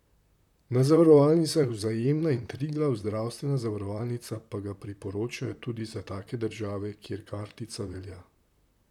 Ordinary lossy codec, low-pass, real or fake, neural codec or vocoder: none; 19.8 kHz; fake; vocoder, 44.1 kHz, 128 mel bands, Pupu-Vocoder